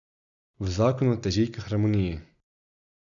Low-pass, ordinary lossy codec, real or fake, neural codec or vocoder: 7.2 kHz; none; real; none